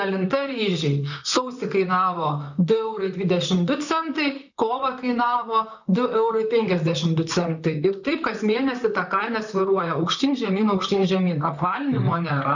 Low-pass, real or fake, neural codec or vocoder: 7.2 kHz; fake; vocoder, 44.1 kHz, 128 mel bands, Pupu-Vocoder